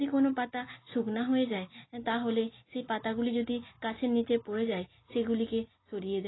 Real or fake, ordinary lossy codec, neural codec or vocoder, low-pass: real; AAC, 16 kbps; none; 7.2 kHz